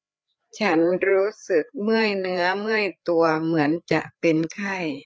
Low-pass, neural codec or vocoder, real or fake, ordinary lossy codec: none; codec, 16 kHz, 4 kbps, FreqCodec, larger model; fake; none